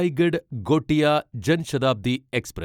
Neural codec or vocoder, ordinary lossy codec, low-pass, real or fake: none; none; 19.8 kHz; real